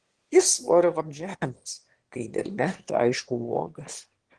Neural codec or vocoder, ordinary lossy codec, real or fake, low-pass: autoencoder, 22.05 kHz, a latent of 192 numbers a frame, VITS, trained on one speaker; Opus, 16 kbps; fake; 9.9 kHz